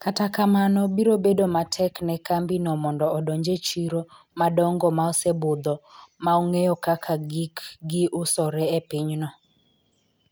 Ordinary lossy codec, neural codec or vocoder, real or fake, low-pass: none; none; real; none